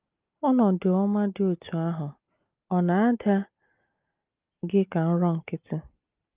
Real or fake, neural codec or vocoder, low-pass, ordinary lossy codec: real; none; 3.6 kHz; Opus, 24 kbps